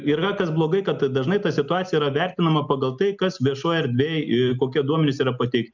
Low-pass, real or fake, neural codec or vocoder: 7.2 kHz; real; none